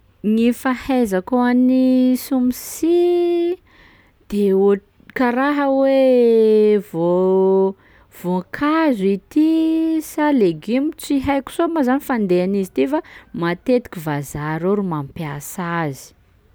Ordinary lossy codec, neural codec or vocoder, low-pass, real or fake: none; none; none; real